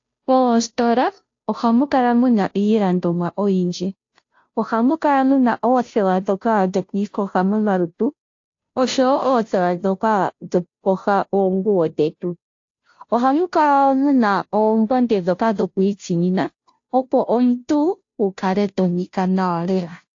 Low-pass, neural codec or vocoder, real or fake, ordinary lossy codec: 7.2 kHz; codec, 16 kHz, 0.5 kbps, FunCodec, trained on Chinese and English, 25 frames a second; fake; AAC, 48 kbps